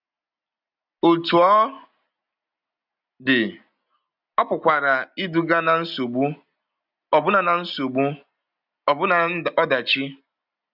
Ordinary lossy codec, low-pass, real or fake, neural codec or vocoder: none; 5.4 kHz; real; none